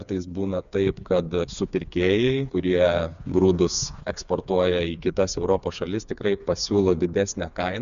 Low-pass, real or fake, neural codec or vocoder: 7.2 kHz; fake; codec, 16 kHz, 4 kbps, FreqCodec, smaller model